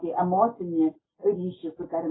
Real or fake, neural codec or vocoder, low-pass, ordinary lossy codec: real; none; 7.2 kHz; AAC, 16 kbps